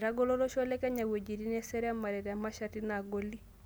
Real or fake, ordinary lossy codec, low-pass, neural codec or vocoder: real; none; none; none